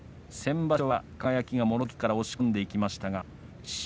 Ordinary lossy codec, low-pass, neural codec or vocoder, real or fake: none; none; none; real